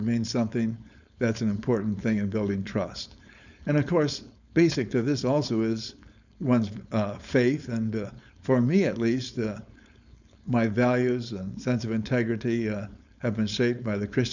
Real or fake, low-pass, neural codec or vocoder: fake; 7.2 kHz; codec, 16 kHz, 4.8 kbps, FACodec